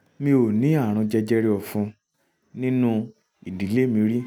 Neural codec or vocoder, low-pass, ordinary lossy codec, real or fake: none; 19.8 kHz; none; real